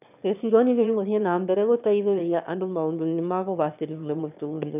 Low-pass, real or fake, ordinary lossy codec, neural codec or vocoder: 3.6 kHz; fake; none; autoencoder, 22.05 kHz, a latent of 192 numbers a frame, VITS, trained on one speaker